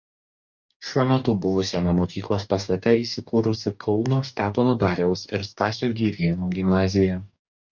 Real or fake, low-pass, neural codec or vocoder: fake; 7.2 kHz; codec, 44.1 kHz, 2.6 kbps, DAC